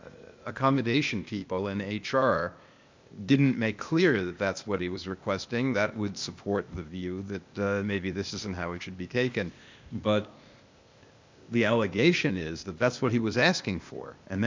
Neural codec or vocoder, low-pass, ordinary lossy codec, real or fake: codec, 16 kHz, 0.8 kbps, ZipCodec; 7.2 kHz; MP3, 64 kbps; fake